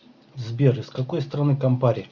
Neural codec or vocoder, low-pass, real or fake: none; 7.2 kHz; real